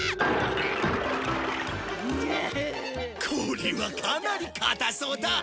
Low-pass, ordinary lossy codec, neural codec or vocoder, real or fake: none; none; none; real